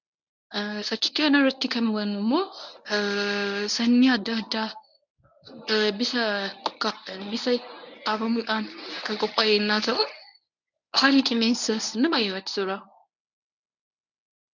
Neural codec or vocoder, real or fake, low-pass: codec, 24 kHz, 0.9 kbps, WavTokenizer, medium speech release version 1; fake; 7.2 kHz